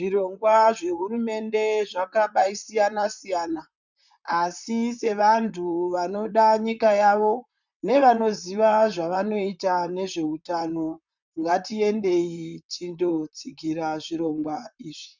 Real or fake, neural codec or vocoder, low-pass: fake; vocoder, 44.1 kHz, 128 mel bands, Pupu-Vocoder; 7.2 kHz